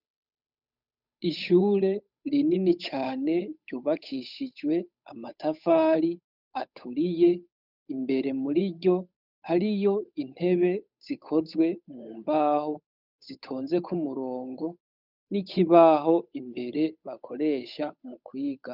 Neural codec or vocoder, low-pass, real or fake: codec, 16 kHz, 8 kbps, FunCodec, trained on Chinese and English, 25 frames a second; 5.4 kHz; fake